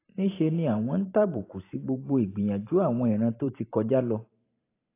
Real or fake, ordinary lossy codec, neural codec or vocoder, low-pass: fake; AAC, 24 kbps; vocoder, 44.1 kHz, 128 mel bands every 512 samples, BigVGAN v2; 3.6 kHz